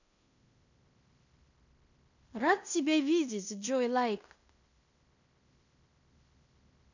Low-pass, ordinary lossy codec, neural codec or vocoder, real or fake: 7.2 kHz; none; codec, 16 kHz in and 24 kHz out, 0.9 kbps, LongCat-Audio-Codec, fine tuned four codebook decoder; fake